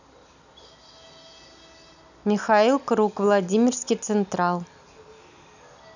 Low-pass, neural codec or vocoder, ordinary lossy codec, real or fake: 7.2 kHz; none; none; real